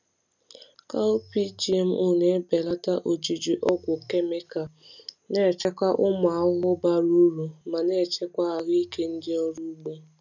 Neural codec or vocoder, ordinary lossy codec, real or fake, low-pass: none; none; real; 7.2 kHz